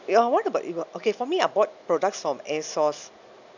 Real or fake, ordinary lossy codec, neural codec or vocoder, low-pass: real; none; none; 7.2 kHz